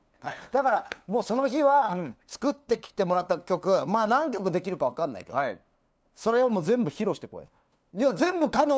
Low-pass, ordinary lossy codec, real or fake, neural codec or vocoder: none; none; fake; codec, 16 kHz, 2 kbps, FunCodec, trained on LibriTTS, 25 frames a second